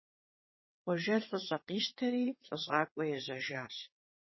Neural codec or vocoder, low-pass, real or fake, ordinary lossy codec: vocoder, 22.05 kHz, 80 mel bands, WaveNeXt; 7.2 kHz; fake; MP3, 24 kbps